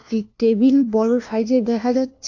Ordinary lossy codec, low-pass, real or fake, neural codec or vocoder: AAC, 48 kbps; 7.2 kHz; fake; codec, 16 kHz, 1 kbps, FunCodec, trained on LibriTTS, 50 frames a second